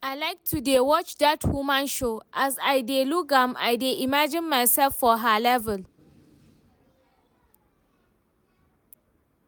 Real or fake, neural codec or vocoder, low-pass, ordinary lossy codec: real; none; none; none